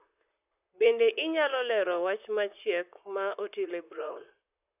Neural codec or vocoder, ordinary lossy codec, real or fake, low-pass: vocoder, 44.1 kHz, 128 mel bands, Pupu-Vocoder; none; fake; 3.6 kHz